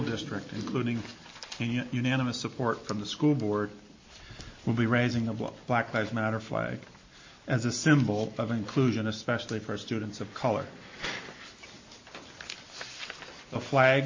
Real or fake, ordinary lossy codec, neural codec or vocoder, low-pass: real; MP3, 32 kbps; none; 7.2 kHz